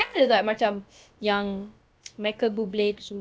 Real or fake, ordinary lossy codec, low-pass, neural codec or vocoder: fake; none; none; codec, 16 kHz, about 1 kbps, DyCAST, with the encoder's durations